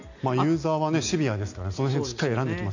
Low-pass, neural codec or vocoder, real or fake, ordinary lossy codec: 7.2 kHz; none; real; none